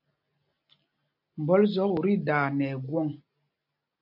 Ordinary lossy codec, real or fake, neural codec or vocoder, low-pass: MP3, 48 kbps; real; none; 5.4 kHz